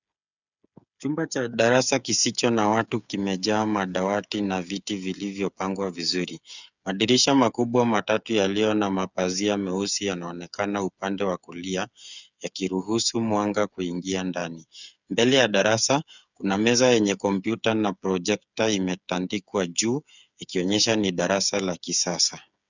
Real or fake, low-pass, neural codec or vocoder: fake; 7.2 kHz; codec, 16 kHz, 8 kbps, FreqCodec, smaller model